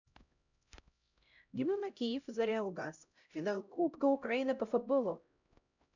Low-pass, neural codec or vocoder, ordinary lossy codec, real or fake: 7.2 kHz; codec, 16 kHz, 0.5 kbps, X-Codec, HuBERT features, trained on LibriSpeech; none; fake